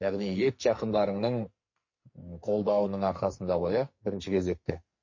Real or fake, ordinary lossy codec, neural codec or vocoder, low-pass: fake; MP3, 32 kbps; codec, 44.1 kHz, 2.6 kbps, SNAC; 7.2 kHz